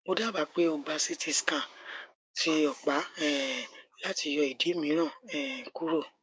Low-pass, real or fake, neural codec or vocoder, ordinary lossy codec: none; fake; codec, 16 kHz, 6 kbps, DAC; none